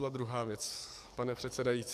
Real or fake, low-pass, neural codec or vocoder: fake; 14.4 kHz; codec, 44.1 kHz, 7.8 kbps, DAC